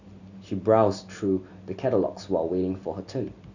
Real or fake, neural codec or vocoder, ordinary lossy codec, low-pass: real; none; none; 7.2 kHz